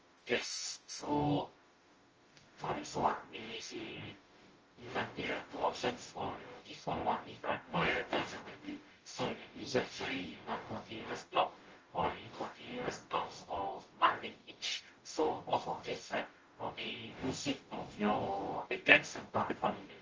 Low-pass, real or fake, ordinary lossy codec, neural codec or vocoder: 7.2 kHz; fake; Opus, 24 kbps; codec, 44.1 kHz, 0.9 kbps, DAC